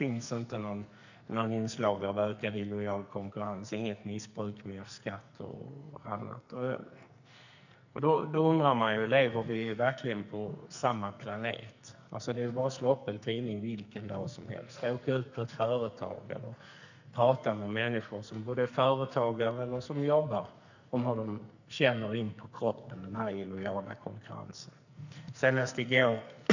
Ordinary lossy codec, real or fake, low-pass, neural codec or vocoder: none; fake; 7.2 kHz; codec, 44.1 kHz, 2.6 kbps, SNAC